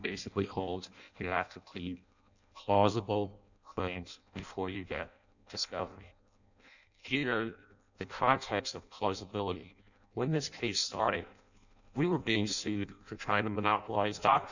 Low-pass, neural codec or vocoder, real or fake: 7.2 kHz; codec, 16 kHz in and 24 kHz out, 0.6 kbps, FireRedTTS-2 codec; fake